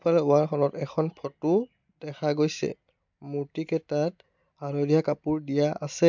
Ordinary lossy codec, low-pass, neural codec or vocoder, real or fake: MP3, 64 kbps; 7.2 kHz; none; real